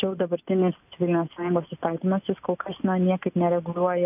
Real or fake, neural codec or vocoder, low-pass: real; none; 3.6 kHz